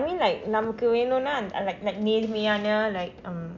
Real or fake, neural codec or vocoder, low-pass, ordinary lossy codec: real; none; 7.2 kHz; none